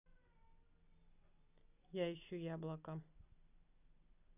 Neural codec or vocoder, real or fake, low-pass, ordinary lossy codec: none; real; 3.6 kHz; none